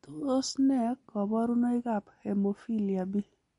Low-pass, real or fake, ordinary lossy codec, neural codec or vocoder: 10.8 kHz; real; MP3, 48 kbps; none